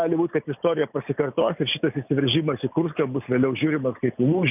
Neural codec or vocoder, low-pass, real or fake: none; 3.6 kHz; real